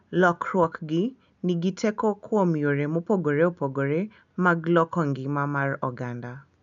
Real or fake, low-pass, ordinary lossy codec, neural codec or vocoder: real; 7.2 kHz; none; none